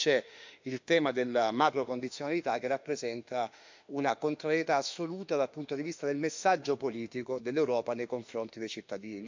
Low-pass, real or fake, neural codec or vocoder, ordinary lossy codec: 7.2 kHz; fake; autoencoder, 48 kHz, 32 numbers a frame, DAC-VAE, trained on Japanese speech; MP3, 64 kbps